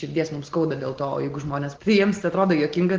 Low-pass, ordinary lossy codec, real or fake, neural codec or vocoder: 7.2 kHz; Opus, 16 kbps; real; none